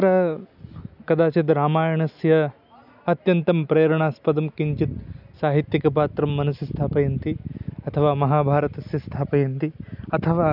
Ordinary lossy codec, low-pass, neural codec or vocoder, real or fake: none; 5.4 kHz; none; real